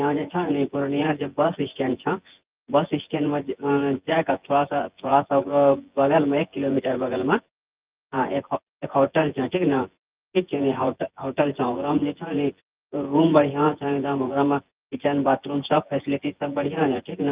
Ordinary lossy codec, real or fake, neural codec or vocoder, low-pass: Opus, 32 kbps; fake; vocoder, 24 kHz, 100 mel bands, Vocos; 3.6 kHz